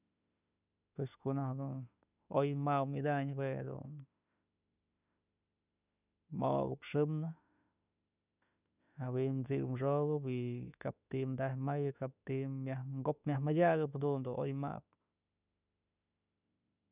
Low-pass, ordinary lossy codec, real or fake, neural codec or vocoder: 3.6 kHz; none; fake; autoencoder, 48 kHz, 32 numbers a frame, DAC-VAE, trained on Japanese speech